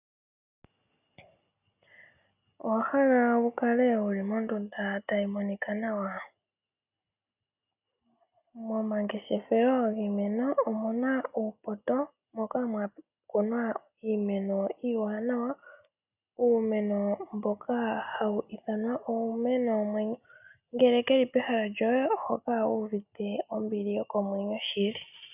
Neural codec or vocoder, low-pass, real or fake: none; 3.6 kHz; real